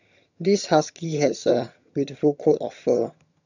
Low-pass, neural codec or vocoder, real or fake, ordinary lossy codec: 7.2 kHz; vocoder, 22.05 kHz, 80 mel bands, HiFi-GAN; fake; none